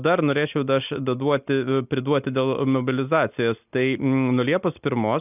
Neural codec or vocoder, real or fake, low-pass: none; real; 3.6 kHz